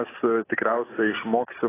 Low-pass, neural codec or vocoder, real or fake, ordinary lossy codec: 3.6 kHz; none; real; AAC, 16 kbps